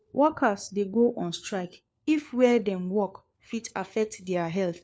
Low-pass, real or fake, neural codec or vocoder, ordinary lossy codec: none; fake; codec, 16 kHz, 4 kbps, FunCodec, trained on LibriTTS, 50 frames a second; none